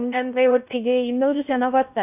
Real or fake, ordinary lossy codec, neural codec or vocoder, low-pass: fake; none; codec, 16 kHz in and 24 kHz out, 0.6 kbps, FocalCodec, streaming, 4096 codes; 3.6 kHz